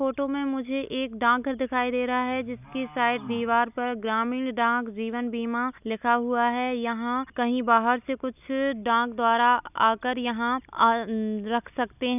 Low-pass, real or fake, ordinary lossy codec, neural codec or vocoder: 3.6 kHz; real; none; none